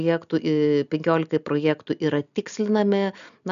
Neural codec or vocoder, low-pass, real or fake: none; 7.2 kHz; real